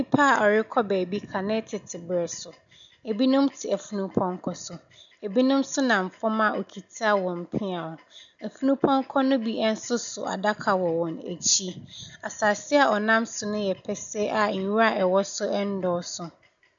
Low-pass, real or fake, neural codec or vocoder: 7.2 kHz; real; none